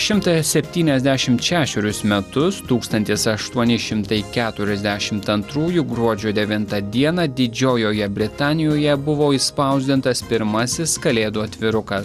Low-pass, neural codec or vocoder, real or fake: 14.4 kHz; none; real